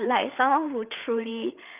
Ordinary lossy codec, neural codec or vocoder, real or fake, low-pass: Opus, 24 kbps; codec, 16 kHz, 8 kbps, FreqCodec, larger model; fake; 3.6 kHz